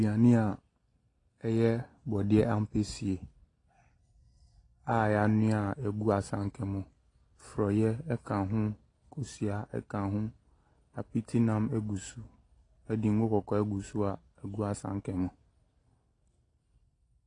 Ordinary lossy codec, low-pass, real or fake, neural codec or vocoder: AAC, 32 kbps; 10.8 kHz; real; none